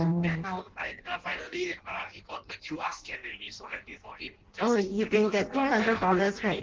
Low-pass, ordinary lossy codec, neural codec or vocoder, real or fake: 7.2 kHz; Opus, 16 kbps; codec, 16 kHz in and 24 kHz out, 0.6 kbps, FireRedTTS-2 codec; fake